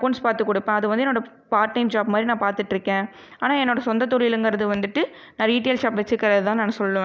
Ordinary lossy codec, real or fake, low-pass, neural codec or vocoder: none; real; none; none